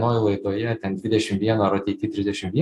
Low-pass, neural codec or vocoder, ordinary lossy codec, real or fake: 14.4 kHz; none; AAC, 64 kbps; real